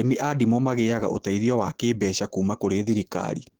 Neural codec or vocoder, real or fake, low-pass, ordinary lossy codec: autoencoder, 48 kHz, 128 numbers a frame, DAC-VAE, trained on Japanese speech; fake; 19.8 kHz; Opus, 16 kbps